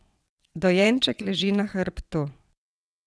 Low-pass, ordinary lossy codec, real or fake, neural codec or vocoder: none; none; fake; vocoder, 22.05 kHz, 80 mel bands, WaveNeXt